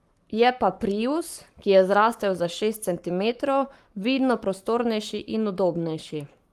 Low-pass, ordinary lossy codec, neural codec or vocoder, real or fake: 14.4 kHz; Opus, 32 kbps; codec, 44.1 kHz, 7.8 kbps, Pupu-Codec; fake